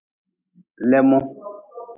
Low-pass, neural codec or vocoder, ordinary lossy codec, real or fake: 3.6 kHz; none; AAC, 32 kbps; real